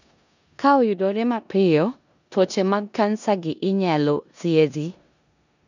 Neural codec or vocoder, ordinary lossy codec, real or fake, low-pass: codec, 16 kHz in and 24 kHz out, 0.9 kbps, LongCat-Audio-Codec, four codebook decoder; none; fake; 7.2 kHz